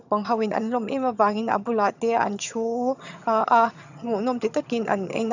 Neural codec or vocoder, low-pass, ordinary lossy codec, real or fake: vocoder, 22.05 kHz, 80 mel bands, HiFi-GAN; 7.2 kHz; none; fake